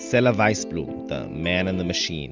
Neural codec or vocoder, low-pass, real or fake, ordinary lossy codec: none; 7.2 kHz; real; Opus, 32 kbps